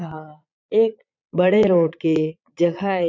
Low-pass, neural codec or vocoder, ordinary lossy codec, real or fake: 7.2 kHz; vocoder, 22.05 kHz, 80 mel bands, Vocos; none; fake